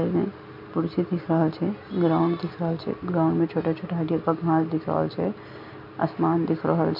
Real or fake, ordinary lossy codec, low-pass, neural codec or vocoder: real; MP3, 48 kbps; 5.4 kHz; none